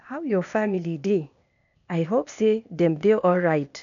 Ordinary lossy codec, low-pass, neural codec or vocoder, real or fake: none; 7.2 kHz; codec, 16 kHz, 0.8 kbps, ZipCodec; fake